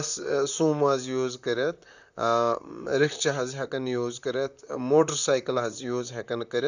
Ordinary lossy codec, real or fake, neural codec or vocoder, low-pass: none; fake; vocoder, 44.1 kHz, 128 mel bands, Pupu-Vocoder; 7.2 kHz